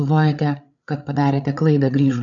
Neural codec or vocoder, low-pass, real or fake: codec, 16 kHz, 8 kbps, FreqCodec, larger model; 7.2 kHz; fake